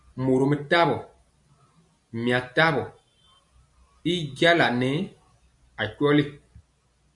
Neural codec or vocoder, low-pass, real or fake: none; 10.8 kHz; real